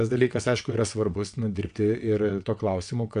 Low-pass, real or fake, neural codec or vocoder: 9.9 kHz; fake; vocoder, 22.05 kHz, 80 mel bands, WaveNeXt